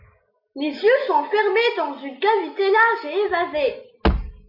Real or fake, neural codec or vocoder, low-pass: real; none; 5.4 kHz